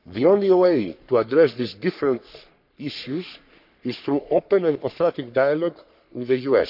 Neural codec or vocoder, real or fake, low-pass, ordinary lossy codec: codec, 44.1 kHz, 3.4 kbps, Pupu-Codec; fake; 5.4 kHz; none